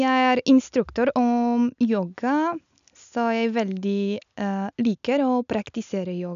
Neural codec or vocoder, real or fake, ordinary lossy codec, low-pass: none; real; none; 7.2 kHz